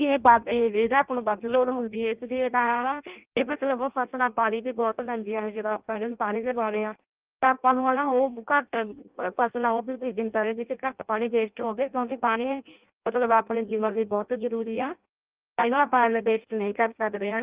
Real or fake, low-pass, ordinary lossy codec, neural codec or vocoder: fake; 3.6 kHz; Opus, 32 kbps; codec, 16 kHz in and 24 kHz out, 0.6 kbps, FireRedTTS-2 codec